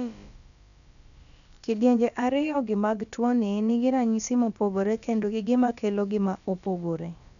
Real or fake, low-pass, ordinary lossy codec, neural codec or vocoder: fake; 7.2 kHz; none; codec, 16 kHz, about 1 kbps, DyCAST, with the encoder's durations